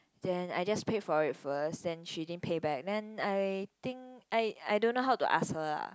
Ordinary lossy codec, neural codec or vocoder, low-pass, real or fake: none; none; none; real